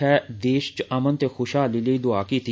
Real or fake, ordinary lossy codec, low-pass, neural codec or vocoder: real; none; none; none